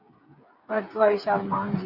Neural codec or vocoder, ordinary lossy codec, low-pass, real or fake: codec, 16 kHz, 8 kbps, FreqCodec, smaller model; Opus, 64 kbps; 5.4 kHz; fake